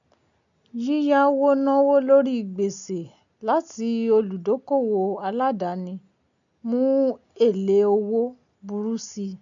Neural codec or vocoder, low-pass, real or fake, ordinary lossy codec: none; 7.2 kHz; real; none